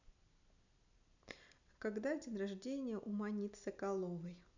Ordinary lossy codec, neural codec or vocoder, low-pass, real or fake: none; none; 7.2 kHz; real